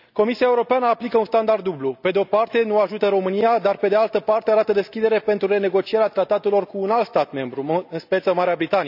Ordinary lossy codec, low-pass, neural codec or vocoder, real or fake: none; 5.4 kHz; none; real